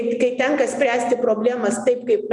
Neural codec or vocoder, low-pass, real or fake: none; 10.8 kHz; real